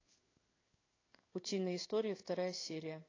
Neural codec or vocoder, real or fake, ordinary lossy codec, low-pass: codec, 16 kHz in and 24 kHz out, 1 kbps, XY-Tokenizer; fake; AAC, 32 kbps; 7.2 kHz